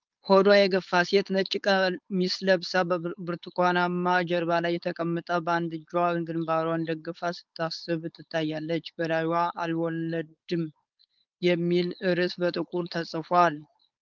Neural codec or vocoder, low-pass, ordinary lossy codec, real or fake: codec, 16 kHz, 4.8 kbps, FACodec; 7.2 kHz; Opus, 24 kbps; fake